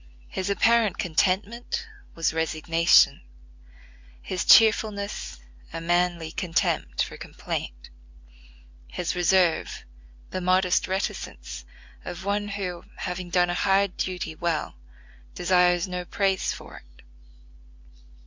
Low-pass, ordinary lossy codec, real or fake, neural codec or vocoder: 7.2 kHz; MP3, 64 kbps; real; none